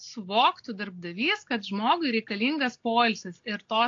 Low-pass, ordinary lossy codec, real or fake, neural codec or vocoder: 7.2 kHz; AAC, 48 kbps; real; none